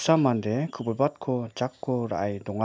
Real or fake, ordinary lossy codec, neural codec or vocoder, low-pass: real; none; none; none